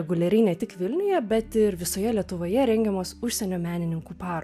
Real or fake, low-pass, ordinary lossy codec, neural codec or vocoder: real; 14.4 kHz; AAC, 96 kbps; none